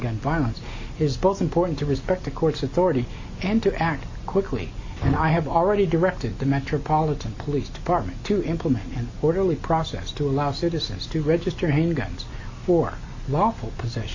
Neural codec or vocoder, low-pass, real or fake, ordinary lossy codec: none; 7.2 kHz; real; AAC, 48 kbps